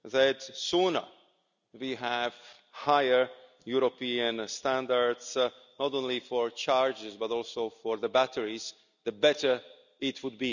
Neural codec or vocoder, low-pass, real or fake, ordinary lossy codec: none; 7.2 kHz; real; none